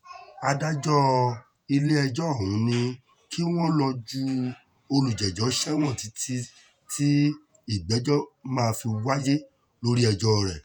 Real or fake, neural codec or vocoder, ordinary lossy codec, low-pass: fake; vocoder, 48 kHz, 128 mel bands, Vocos; none; none